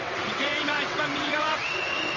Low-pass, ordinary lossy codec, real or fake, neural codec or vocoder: 7.2 kHz; Opus, 32 kbps; fake; vocoder, 22.05 kHz, 80 mel bands, WaveNeXt